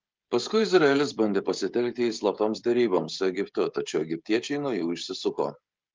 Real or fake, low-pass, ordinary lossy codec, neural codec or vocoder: fake; 7.2 kHz; Opus, 32 kbps; codec, 16 kHz, 16 kbps, FreqCodec, smaller model